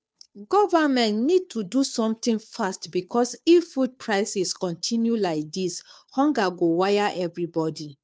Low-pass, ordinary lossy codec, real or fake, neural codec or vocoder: none; none; fake; codec, 16 kHz, 2 kbps, FunCodec, trained on Chinese and English, 25 frames a second